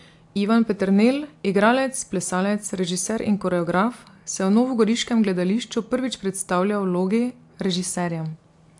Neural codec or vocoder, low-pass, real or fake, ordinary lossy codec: none; 10.8 kHz; real; AAC, 64 kbps